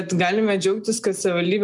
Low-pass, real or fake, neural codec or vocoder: 10.8 kHz; real; none